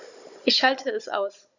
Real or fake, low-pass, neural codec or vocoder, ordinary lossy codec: fake; 7.2 kHz; codec, 16 kHz, 16 kbps, FunCodec, trained on Chinese and English, 50 frames a second; none